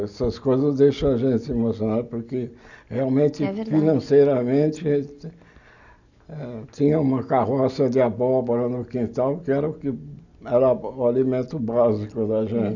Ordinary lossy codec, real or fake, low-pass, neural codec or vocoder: none; real; 7.2 kHz; none